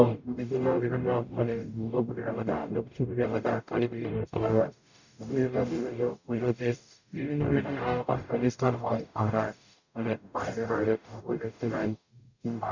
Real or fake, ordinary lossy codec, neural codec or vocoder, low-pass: fake; none; codec, 44.1 kHz, 0.9 kbps, DAC; 7.2 kHz